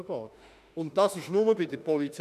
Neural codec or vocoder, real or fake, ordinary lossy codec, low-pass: autoencoder, 48 kHz, 32 numbers a frame, DAC-VAE, trained on Japanese speech; fake; none; 14.4 kHz